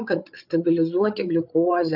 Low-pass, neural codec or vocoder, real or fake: 5.4 kHz; codec, 16 kHz, 8 kbps, FreqCodec, larger model; fake